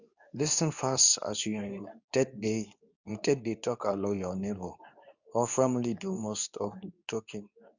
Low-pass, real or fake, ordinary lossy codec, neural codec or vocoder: 7.2 kHz; fake; none; codec, 24 kHz, 0.9 kbps, WavTokenizer, medium speech release version 2